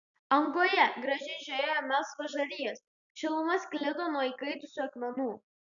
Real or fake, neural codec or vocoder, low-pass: real; none; 7.2 kHz